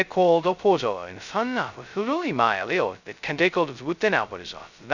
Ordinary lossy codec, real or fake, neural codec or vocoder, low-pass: none; fake; codec, 16 kHz, 0.2 kbps, FocalCodec; 7.2 kHz